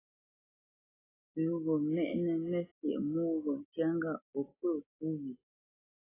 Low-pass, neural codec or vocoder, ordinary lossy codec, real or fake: 3.6 kHz; none; AAC, 16 kbps; real